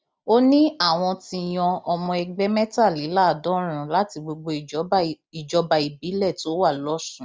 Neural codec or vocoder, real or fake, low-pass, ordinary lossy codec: none; real; none; none